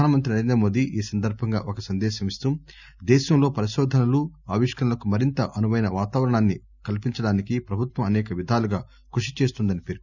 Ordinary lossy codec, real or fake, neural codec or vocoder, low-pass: none; real; none; 7.2 kHz